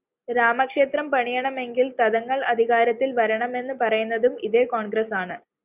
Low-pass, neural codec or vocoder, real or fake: 3.6 kHz; none; real